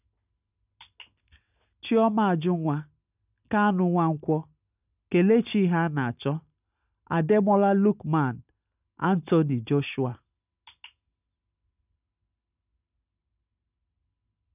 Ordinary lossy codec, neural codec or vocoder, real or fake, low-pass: none; none; real; 3.6 kHz